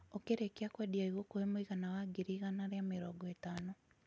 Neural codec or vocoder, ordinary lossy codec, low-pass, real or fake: none; none; none; real